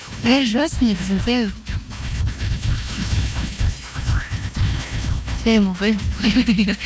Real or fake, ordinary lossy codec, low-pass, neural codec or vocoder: fake; none; none; codec, 16 kHz, 1 kbps, FunCodec, trained on Chinese and English, 50 frames a second